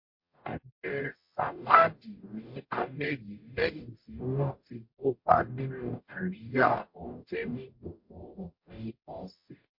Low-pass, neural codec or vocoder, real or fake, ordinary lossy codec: 5.4 kHz; codec, 44.1 kHz, 0.9 kbps, DAC; fake; MP3, 32 kbps